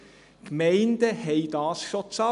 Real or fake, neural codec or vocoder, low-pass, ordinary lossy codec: real; none; 10.8 kHz; none